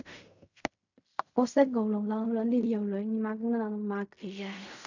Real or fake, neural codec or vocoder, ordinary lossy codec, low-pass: fake; codec, 16 kHz in and 24 kHz out, 0.4 kbps, LongCat-Audio-Codec, fine tuned four codebook decoder; none; 7.2 kHz